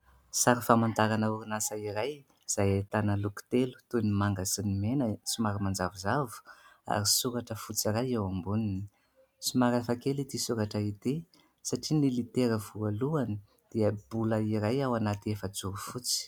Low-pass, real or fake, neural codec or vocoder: 19.8 kHz; real; none